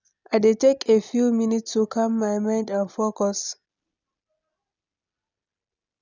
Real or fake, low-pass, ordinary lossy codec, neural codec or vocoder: real; 7.2 kHz; none; none